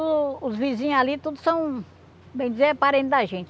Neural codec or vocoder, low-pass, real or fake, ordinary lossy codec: none; none; real; none